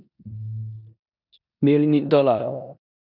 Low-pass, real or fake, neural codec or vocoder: 5.4 kHz; fake; codec, 16 kHz in and 24 kHz out, 0.9 kbps, LongCat-Audio-Codec, four codebook decoder